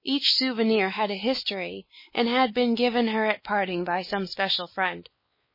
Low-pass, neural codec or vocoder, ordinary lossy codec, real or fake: 5.4 kHz; codec, 16 kHz, 4 kbps, X-Codec, WavLM features, trained on Multilingual LibriSpeech; MP3, 24 kbps; fake